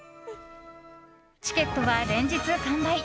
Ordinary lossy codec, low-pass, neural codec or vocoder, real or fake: none; none; none; real